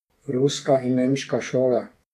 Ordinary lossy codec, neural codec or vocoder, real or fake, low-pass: none; codec, 32 kHz, 1.9 kbps, SNAC; fake; 14.4 kHz